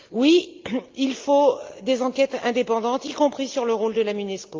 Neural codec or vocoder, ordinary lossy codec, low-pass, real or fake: none; Opus, 32 kbps; 7.2 kHz; real